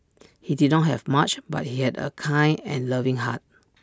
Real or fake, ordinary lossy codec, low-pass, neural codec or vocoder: real; none; none; none